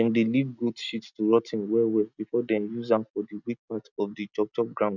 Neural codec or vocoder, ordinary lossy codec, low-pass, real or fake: none; none; 7.2 kHz; real